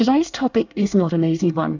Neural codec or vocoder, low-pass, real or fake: codec, 24 kHz, 1 kbps, SNAC; 7.2 kHz; fake